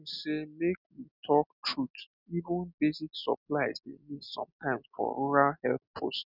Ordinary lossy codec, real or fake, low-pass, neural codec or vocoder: none; real; 5.4 kHz; none